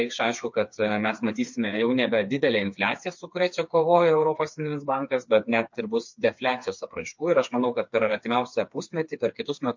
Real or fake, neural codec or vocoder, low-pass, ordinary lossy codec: fake; codec, 16 kHz, 4 kbps, FreqCodec, smaller model; 7.2 kHz; MP3, 48 kbps